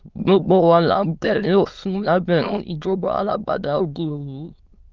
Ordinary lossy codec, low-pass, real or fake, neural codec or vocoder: Opus, 24 kbps; 7.2 kHz; fake; autoencoder, 22.05 kHz, a latent of 192 numbers a frame, VITS, trained on many speakers